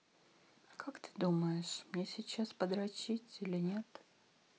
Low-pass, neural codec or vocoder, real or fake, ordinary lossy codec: none; none; real; none